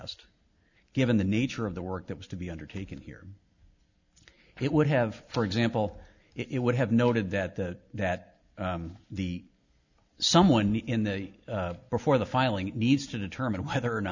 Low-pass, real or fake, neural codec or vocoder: 7.2 kHz; real; none